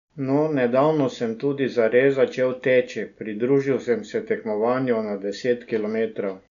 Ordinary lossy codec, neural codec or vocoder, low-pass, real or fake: none; none; 7.2 kHz; real